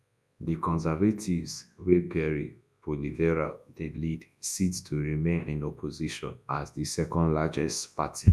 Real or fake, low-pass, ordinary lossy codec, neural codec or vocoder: fake; none; none; codec, 24 kHz, 0.9 kbps, WavTokenizer, large speech release